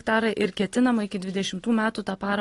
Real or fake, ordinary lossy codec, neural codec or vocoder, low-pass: real; AAC, 32 kbps; none; 10.8 kHz